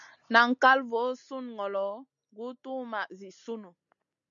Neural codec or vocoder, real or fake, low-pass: none; real; 7.2 kHz